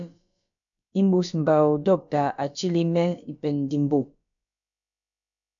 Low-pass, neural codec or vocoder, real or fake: 7.2 kHz; codec, 16 kHz, about 1 kbps, DyCAST, with the encoder's durations; fake